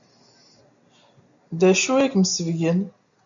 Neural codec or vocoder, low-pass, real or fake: none; 7.2 kHz; real